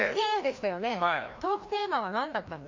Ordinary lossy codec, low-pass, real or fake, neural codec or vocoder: MP3, 48 kbps; 7.2 kHz; fake; codec, 16 kHz, 1 kbps, FreqCodec, larger model